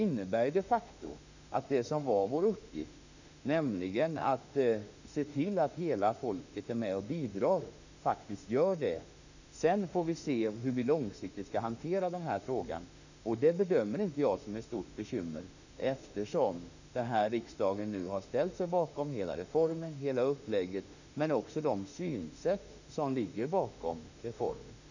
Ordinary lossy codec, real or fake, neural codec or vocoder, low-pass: none; fake; autoencoder, 48 kHz, 32 numbers a frame, DAC-VAE, trained on Japanese speech; 7.2 kHz